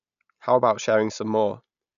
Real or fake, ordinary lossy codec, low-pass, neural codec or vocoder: real; none; 7.2 kHz; none